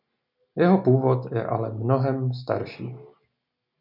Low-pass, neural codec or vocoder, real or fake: 5.4 kHz; none; real